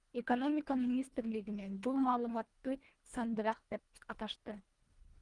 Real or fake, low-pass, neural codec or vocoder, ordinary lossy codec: fake; 10.8 kHz; codec, 24 kHz, 1.5 kbps, HILCodec; Opus, 24 kbps